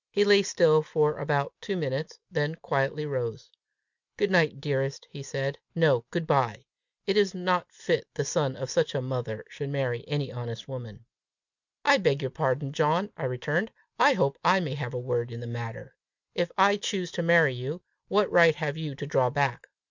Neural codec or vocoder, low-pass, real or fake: none; 7.2 kHz; real